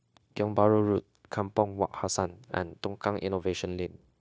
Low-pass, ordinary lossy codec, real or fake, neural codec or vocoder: none; none; fake; codec, 16 kHz, 0.9 kbps, LongCat-Audio-Codec